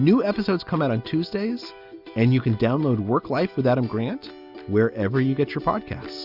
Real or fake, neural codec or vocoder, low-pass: real; none; 5.4 kHz